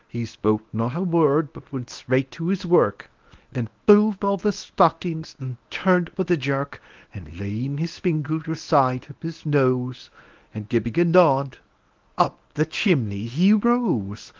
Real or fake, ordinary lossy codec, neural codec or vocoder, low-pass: fake; Opus, 32 kbps; codec, 24 kHz, 0.9 kbps, WavTokenizer, medium speech release version 1; 7.2 kHz